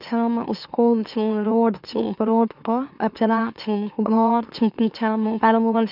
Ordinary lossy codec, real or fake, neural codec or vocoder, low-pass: none; fake; autoencoder, 44.1 kHz, a latent of 192 numbers a frame, MeloTTS; 5.4 kHz